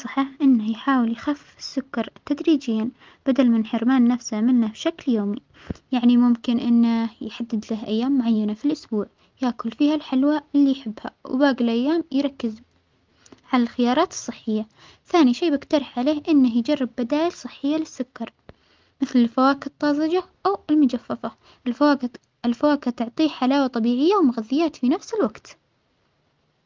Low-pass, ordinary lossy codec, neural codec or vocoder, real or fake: 7.2 kHz; Opus, 24 kbps; none; real